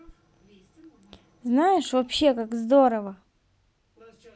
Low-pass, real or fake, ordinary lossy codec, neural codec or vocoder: none; real; none; none